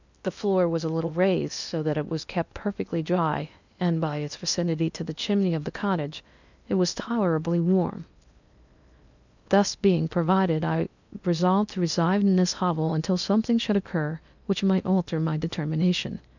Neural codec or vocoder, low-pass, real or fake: codec, 16 kHz in and 24 kHz out, 0.8 kbps, FocalCodec, streaming, 65536 codes; 7.2 kHz; fake